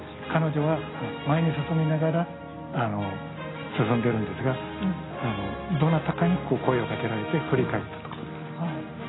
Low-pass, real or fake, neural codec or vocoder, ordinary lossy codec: 7.2 kHz; real; none; AAC, 16 kbps